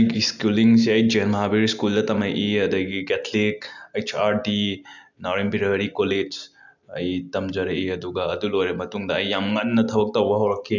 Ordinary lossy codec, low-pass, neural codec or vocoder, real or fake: none; 7.2 kHz; none; real